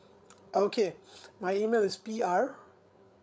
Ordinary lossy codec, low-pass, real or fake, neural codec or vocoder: none; none; fake; codec, 16 kHz, 8 kbps, FreqCodec, larger model